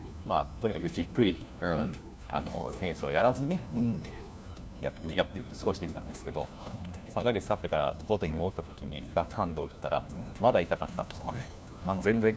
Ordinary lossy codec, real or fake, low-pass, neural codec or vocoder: none; fake; none; codec, 16 kHz, 1 kbps, FunCodec, trained on LibriTTS, 50 frames a second